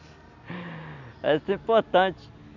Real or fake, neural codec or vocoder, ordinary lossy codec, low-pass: real; none; none; 7.2 kHz